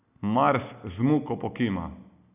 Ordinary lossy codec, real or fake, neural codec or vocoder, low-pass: none; real; none; 3.6 kHz